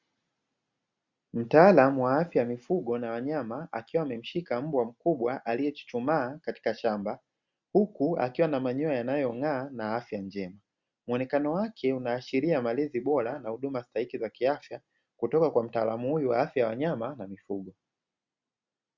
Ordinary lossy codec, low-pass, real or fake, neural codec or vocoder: Opus, 64 kbps; 7.2 kHz; real; none